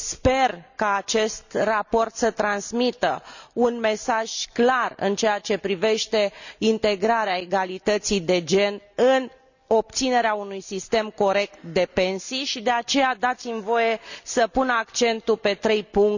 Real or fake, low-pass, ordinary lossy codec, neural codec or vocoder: real; 7.2 kHz; none; none